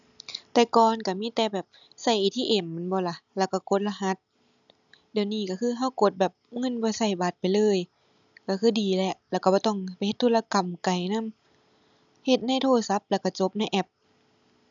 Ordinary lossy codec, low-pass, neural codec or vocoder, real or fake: none; 7.2 kHz; none; real